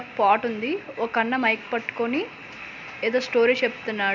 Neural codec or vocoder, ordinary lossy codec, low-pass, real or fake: none; none; 7.2 kHz; real